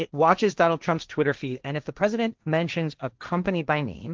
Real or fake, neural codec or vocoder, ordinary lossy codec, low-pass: fake; codec, 16 kHz, 1.1 kbps, Voila-Tokenizer; Opus, 32 kbps; 7.2 kHz